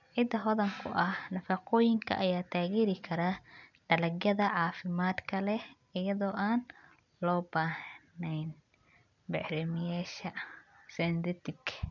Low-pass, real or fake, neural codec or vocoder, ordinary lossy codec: 7.2 kHz; real; none; none